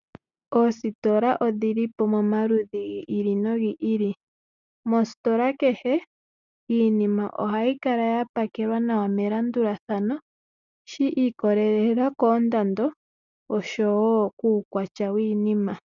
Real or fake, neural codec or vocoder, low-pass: real; none; 7.2 kHz